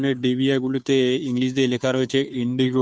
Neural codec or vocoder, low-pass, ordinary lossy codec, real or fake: codec, 16 kHz, 2 kbps, FunCodec, trained on Chinese and English, 25 frames a second; none; none; fake